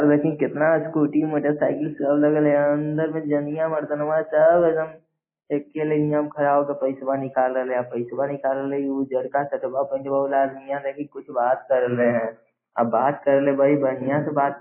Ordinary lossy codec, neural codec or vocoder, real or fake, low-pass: MP3, 16 kbps; none; real; 3.6 kHz